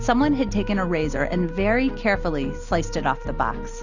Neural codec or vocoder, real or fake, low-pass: none; real; 7.2 kHz